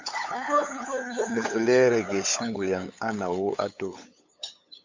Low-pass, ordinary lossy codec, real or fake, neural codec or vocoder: 7.2 kHz; MP3, 64 kbps; fake; codec, 16 kHz, 8 kbps, FunCodec, trained on LibriTTS, 25 frames a second